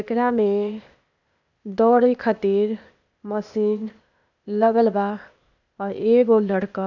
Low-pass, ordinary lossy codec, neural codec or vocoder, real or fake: 7.2 kHz; none; codec, 16 kHz, 0.8 kbps, ZipCodec; fake